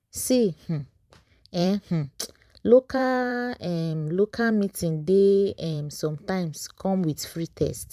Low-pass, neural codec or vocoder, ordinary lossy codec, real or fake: 14.4 kHz; vocoder, 44.1 kHz, 128 mel bands every 512 samples, BigVGAN v2; none; fake